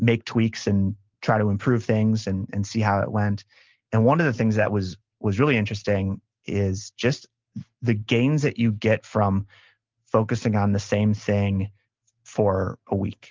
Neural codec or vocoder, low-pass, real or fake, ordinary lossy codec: none; 7.2 kHz; real; Opus, 32 kbps